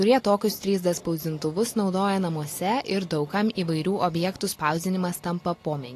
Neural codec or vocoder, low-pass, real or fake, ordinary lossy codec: none; 14.4 kHz; real; AAC, 48 kbps